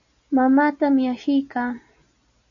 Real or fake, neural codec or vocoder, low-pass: real; none; 7.2 kHz